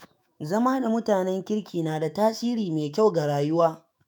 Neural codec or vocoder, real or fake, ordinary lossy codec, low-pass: autoencoder, 48 kHz, 128 numbers a frame, DAC-VAE, trained on Japanese speech; fake; none; none